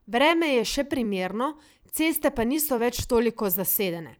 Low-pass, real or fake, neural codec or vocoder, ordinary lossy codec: none; fake; vocoder, 44.1 kHz, 128 mel bands every 256 samples, BigVGAN v2; none